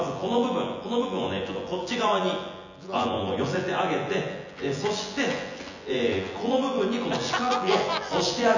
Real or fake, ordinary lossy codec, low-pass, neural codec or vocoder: fake; none; 7.2 kHz; vocoder, 24 kHz, 100 mel bands, Vocos